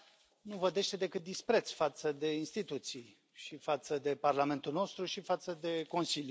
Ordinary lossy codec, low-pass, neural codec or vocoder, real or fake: none; none; none; real